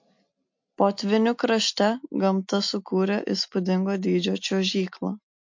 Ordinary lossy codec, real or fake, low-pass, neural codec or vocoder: MP3, 48 kbps; real; 7.2 kHz; none